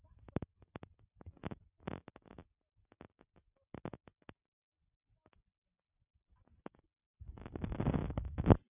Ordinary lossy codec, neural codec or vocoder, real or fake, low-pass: none; none; real; 3.6 kHz